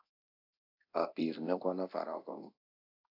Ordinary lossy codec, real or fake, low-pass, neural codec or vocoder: AAC, 32 kbps; fake; 5.4 kHz; codec, 24 kHz, 0.9 kbps, DualCodec